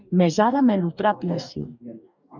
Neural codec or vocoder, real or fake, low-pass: codec, 44.1 kHz, 2.6 kbps, DAC; fake; 7.2 kHz